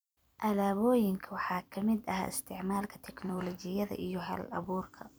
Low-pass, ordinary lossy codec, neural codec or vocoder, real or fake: none; none; vocoder, 44.1 kHz, 128 mel bands every 256 samples, BigVGAN v2; fake